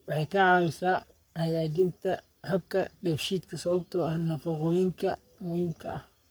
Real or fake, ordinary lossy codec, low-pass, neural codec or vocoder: fake; none; none; codec, 44.1 kHz, 3.4 kbps, Pupu-Codec